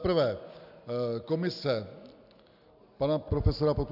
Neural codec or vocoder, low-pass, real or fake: none; 5.4 kHz; real